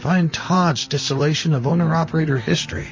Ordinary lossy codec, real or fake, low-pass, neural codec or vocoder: MP3, 32 kbps; fake; 7.2 kHz; vocoder, 44.1 kHz, 128 mel bands, Pupu-Vocoder